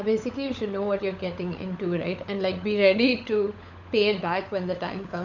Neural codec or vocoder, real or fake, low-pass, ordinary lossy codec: codec, 16 kHz, 16 kbps, FunCodec, trained on LibriTTS, 50 frames a second; fake; 7.2 kHz; none